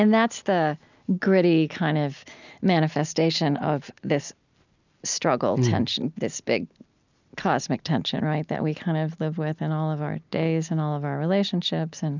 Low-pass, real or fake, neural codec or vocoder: 7.2 kHz; real; none